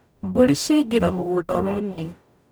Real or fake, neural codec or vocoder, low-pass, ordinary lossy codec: fake; codec, 44.1 kHz, 0.9 kbps, DAC; none; none